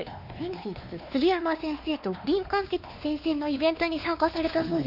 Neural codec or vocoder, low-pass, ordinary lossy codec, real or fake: codec, 16 kHz, 2 kbps, X-Codec, WavLM features, trained on Multilingual LibriSpeech; 5.4 kHz; none; fake